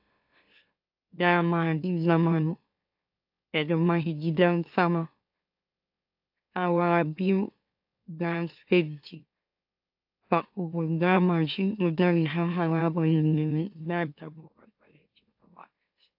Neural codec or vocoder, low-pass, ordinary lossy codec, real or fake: autoencoder, 44.1 kHz, a latent of 192 numbers a frame, MeloTTS; 5.4 kHz; AAC, 48 kbps; fake